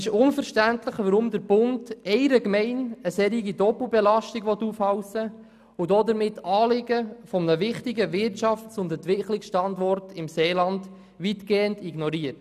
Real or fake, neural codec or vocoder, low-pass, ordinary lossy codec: real; none; 14.4 kHz; none